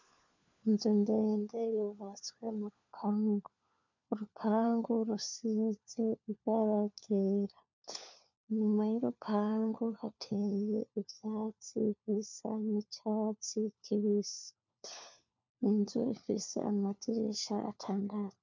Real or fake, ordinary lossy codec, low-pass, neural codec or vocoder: fake; MP3, 64 kbps; 7.2 kHz; codec, 16 kHz, 4 kbps, FunCodec, trained on LibriTTS, 50 frames a second